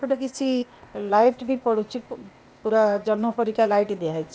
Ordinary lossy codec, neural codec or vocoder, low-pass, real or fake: none; codec, 16 kHz, 0.8 kbps, ZipCodec; none; fake